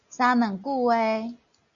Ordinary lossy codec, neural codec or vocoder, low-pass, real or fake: MP3, 96 kbps; none; 7.2 kHz; real